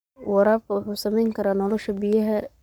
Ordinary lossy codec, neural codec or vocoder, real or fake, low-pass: none; codec, 44.1 kHz, 7.8 kbps, Pupu-Codec; fake; none